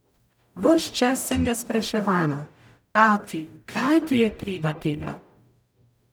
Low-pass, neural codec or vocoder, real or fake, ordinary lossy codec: none; codec, 44.1 kHz, 0.9 kbps, DAC; fake; none